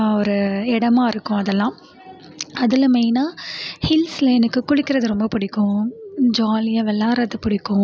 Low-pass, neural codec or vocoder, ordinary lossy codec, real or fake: none; none; none; real